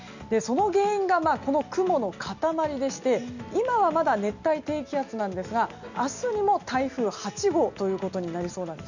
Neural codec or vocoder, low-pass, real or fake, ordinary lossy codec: none; 7.2 kHz; real; none